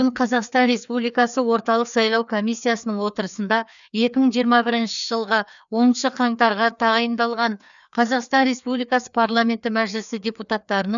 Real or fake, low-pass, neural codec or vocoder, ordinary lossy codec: fake; 7.2 kHz; codec, 16 kHz, 2 kbps, FreqCodec, larger model; none